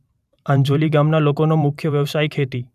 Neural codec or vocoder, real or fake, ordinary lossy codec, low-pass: vocoder, 44.1 kHz, 128 mel bands every 512 samples, BigVGAN v2; fake; none; 14.4 kHz